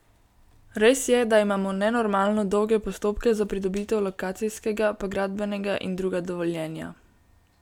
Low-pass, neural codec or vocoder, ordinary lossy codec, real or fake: 19.8 kHz; none; none; real